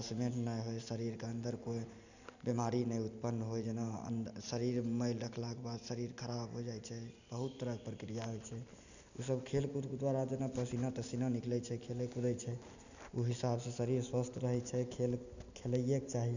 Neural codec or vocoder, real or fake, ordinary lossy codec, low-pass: none; real; none; 7.2 kHz